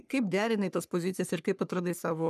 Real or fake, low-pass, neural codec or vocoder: fake; 14.4 kHz; codec, 44.1 kHz, 3.4 kbps, Pupu-Codec